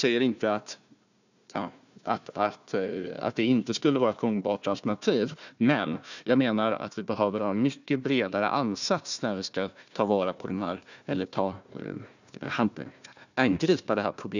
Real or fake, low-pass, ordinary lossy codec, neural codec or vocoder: fake; 7.2 kHz; none; codec, 16 kHz, 1 kbps, FunCodec, trained on Chinese and English, 50 frames a second